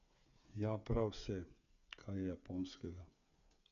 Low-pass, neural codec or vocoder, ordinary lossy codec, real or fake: 7.2 kHz; codec, 16 kHz, 8 kbps, FreqCodec, smaller model; none; fake